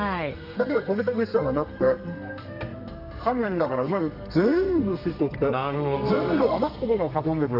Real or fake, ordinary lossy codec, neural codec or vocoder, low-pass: fake; none; codec, 32 kHz, 1.9 kbps, SNAC; 5.4 kHz